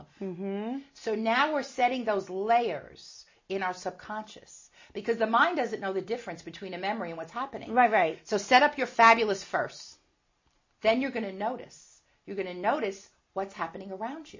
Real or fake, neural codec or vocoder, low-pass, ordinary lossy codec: real; none; 7.2 kHz; MP3, 32 kbps